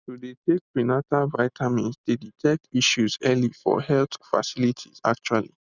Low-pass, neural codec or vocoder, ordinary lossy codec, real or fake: 7.2 kHz; none; none; real